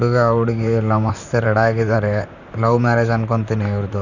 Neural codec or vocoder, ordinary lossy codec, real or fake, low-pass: vocoder, 44.1 kHz, 128 mel bands, Pupu-Vocoder; none; fake; 7.2 kHz